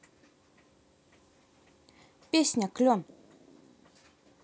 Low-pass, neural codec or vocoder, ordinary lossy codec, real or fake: none; none; none; real